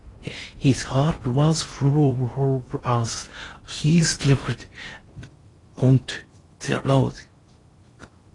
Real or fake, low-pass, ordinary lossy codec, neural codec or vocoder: fake; 10.8 kHz; AAC, 32 kbps; codec, 16 kHz in and 24 kHz out, 0.6 kbps, FocalCodec, streaming, 4096 codes